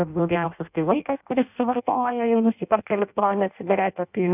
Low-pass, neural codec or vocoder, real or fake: 3.6 kHz; codec, 16 kHz in and 24 kHz out, 0.6 kbps, FireRedTTS-2 codec; fake